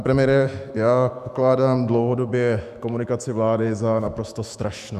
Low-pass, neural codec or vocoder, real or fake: 14.4 kHz; autoencoder, 48 kHz, 128 numbers a frame, DAC-VAE, trained on Japanese speech; fake